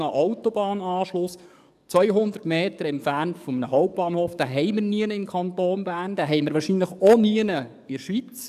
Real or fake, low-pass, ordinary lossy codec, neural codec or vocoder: fake; 14.4 kHz; none; codec, 44.1 kHz, 7.8 kbps, DAC